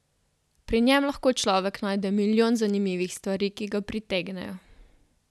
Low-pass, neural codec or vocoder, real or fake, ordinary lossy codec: none; none; real; none